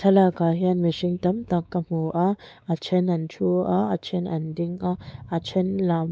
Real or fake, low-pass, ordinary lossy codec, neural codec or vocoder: fake; none; none; codec, 16 kHz, 4 kbps, X-Codec, WavLM features, trained on Multilingual LibriSpeech